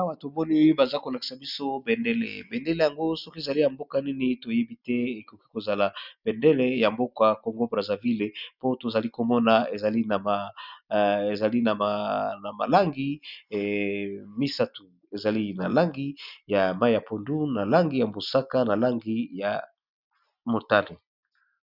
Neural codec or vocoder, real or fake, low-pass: none; real; 5.4 kHz